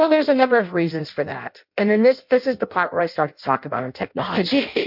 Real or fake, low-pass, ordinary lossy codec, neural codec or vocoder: fake; 5.4 kHz; MP3, 48 kbps; codec, 16 kHz in and 24 kHz out, 0.6 kbps, FireRedTTS-2 codec